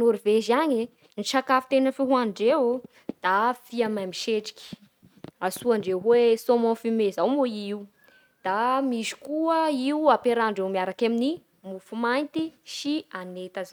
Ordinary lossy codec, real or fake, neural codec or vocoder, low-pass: none; real; none; 19.8 kHz